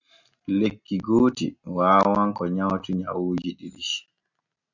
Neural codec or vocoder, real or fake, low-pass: none; real; 7.2 kHz